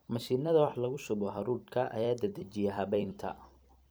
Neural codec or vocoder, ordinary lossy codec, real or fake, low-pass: vocoder, 44.1 kHz, 128 mel bands every 256 samples, BigVGAN v2; none; fake; none